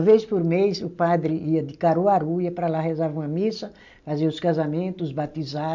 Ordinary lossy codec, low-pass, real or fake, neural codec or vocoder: MP3, 64 kbps; 7.2 kHz; real; none